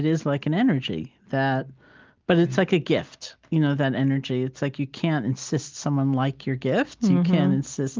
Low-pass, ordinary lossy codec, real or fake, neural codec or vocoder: 7.2 kHz; Opus, 32 kbps; real; none